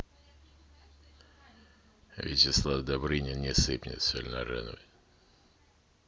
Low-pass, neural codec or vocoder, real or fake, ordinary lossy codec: none; none; real; none